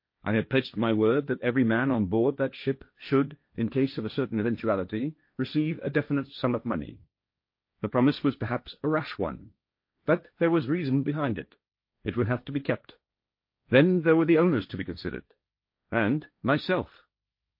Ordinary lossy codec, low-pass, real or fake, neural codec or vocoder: MP3, 32 kbps; 5.4 kHz; fake; codec, 16 kHz, 1.1 kbps, Voila-Tokenizer